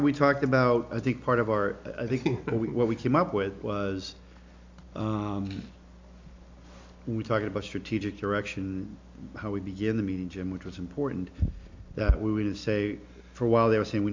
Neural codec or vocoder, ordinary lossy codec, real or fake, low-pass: none; MP3, 64 kbps; real; 7.2 kHz